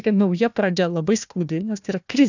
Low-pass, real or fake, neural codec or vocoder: 7.2 kHz; fake; codec, 16 kHz, 1 kbps, FunCodec, trained on Chinese and English, 50 frames a second